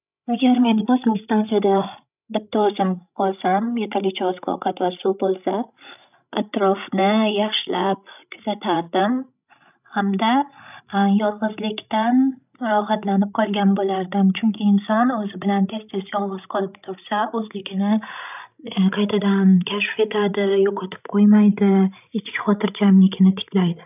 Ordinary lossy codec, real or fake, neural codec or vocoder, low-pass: none; fake; codec, 16 kHz, 16 kbps, FreqCodec, larger model; 3.6 kHz